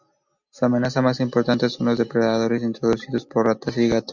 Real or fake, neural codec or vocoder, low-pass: real; none; 7.2 kHz